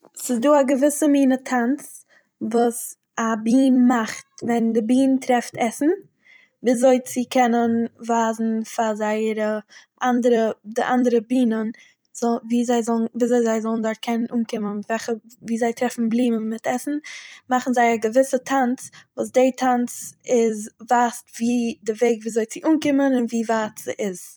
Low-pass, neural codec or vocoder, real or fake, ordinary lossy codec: none; vocoder, 44.1 kHz, 128 mel bands every 256 samples, BigVGAN v2; fake; none